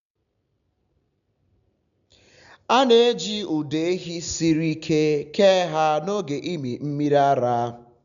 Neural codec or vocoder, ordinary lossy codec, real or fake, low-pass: none; none; real; 7.2 kHz